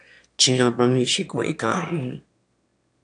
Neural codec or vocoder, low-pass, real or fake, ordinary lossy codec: autoencoder, 22.05 kHz, a latent of 192 numbers a frame, VITS, trained on one speaker; 9.9 kHz; fake; AAC, 64 kbps